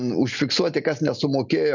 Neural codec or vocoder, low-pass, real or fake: none; 7.2 kHz; real